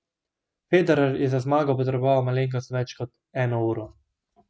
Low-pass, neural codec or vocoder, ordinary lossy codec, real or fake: none; none; none; real